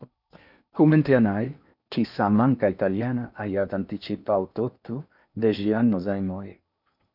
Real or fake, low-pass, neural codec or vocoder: fake; 5.4 kHz; codec, 16 kHz in and 24 kHz out, 0.8 kbps, FocalCodec, streaming, 65536 codes